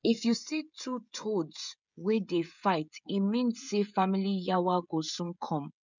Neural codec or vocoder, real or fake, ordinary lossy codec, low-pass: codec, 16 kHz, 16 kbps, FreqCodec, smaller model; fake; none; 7.2 kHz